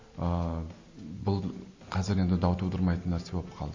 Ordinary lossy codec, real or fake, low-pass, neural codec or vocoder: MP3, 32 kbps; real; 7.2 kHz; none